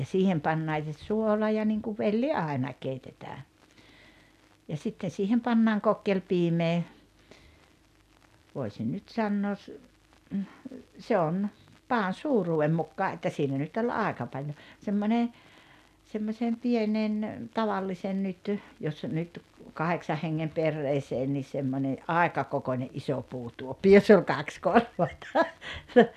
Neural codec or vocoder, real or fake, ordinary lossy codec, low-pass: none; real; none; 14.4 kHz